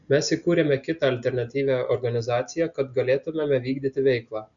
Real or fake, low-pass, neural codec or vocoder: real; 7.2 kHz; none